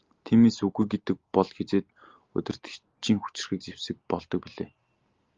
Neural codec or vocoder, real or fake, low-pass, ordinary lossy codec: none; real; 7.2 kHz; Opus, 32 kbps